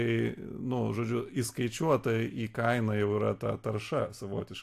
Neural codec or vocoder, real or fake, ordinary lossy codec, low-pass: none; real; AAC, 64 kbps; 14.4 kHz